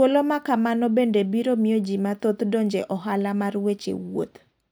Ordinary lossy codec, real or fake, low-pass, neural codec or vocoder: none; real; none; none